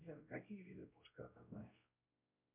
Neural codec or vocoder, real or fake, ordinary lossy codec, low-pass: codec, 16 kHz, 0.5 kbps, X-Codec, WavLM features, trained on Multilingual LibriSpeech; fake; MP3, 32 kbps; 3.6 kHz